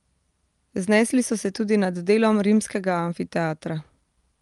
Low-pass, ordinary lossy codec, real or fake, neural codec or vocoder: 10.8 kHz; Opus, 32 kbps; real; none